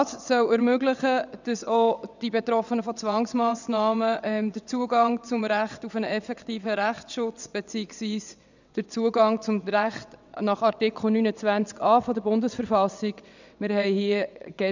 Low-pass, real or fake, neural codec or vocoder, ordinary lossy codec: 7.2 kHz; fake; vocoder, 22.05 kHz, 80 mel bands, WaveNeXt; none